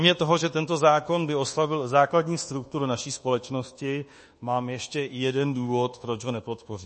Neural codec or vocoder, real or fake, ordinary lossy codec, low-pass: codec, 24 kHz, 1.2 kbps, DualCodec; fake; MP3, 32 kbps; 10.8 kHz